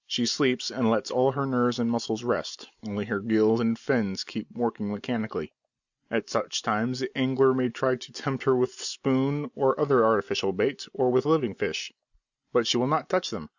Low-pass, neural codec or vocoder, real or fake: 7.2 kHz; none; real